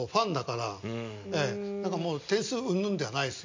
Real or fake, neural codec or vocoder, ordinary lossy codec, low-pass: real; none; MP3, 64 kbps; 7.2 kHz